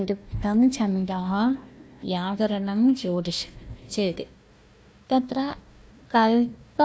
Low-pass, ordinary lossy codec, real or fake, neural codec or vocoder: none; none; fake; codec, 16 kHz, 1 kbps, FunCodec, trained on Chinese and English, 50 frames a second